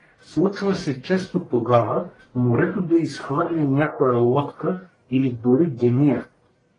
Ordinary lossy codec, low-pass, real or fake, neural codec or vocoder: AAC, 32 kbps; 10.8 kHz; fake; codec, 44.1 kHz, 1.7 kbps, Pupu-Codec